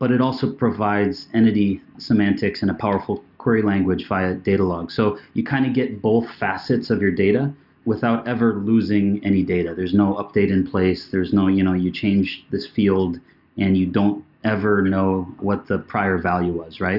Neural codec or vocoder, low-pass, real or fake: none; 5.4 kHz; real